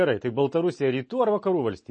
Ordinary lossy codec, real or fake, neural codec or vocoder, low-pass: MP3, 32 kbps; real; none; 10.8 kHz